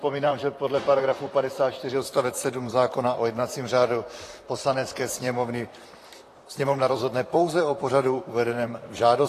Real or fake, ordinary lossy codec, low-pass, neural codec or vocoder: fake; AAC, 48 kbps; 14.4 kHz; vocoder, 44.1 kHz, 128 mel bands, Pupu-Vocoder